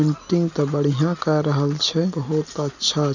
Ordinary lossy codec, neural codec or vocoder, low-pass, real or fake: none; none; 7.2 kHz; real